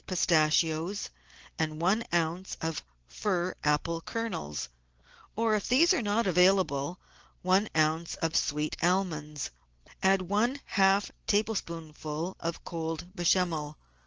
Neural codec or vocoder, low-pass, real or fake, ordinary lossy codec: none; 7.2 kHz; real; Opus, 16 kbps